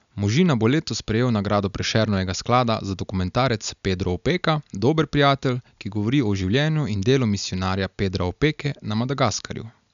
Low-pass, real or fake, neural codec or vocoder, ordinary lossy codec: 7.2 kHz; real; none; none